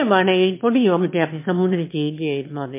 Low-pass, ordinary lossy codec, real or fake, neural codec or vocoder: 3.6 kHz; MP3, 24 kbps; fake; autoencoder, 22.05 kHz, a latent of 192 numbers a frame, VITS, trained on one speaker